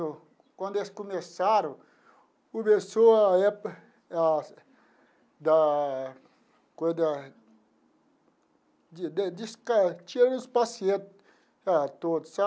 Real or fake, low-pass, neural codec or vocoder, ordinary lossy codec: real; none; none; none